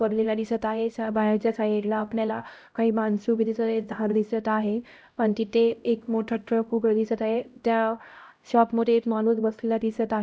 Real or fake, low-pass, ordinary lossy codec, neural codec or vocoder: fake; none; none; codec, 16 kHz, 0.5 kbps, X-Codec, HuBERT features, trained on LibriSpeech